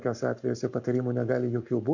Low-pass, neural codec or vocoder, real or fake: 7.2 kHz; codec, 44.1 kHz, 7.8 kbps, Pupu-Codec; fake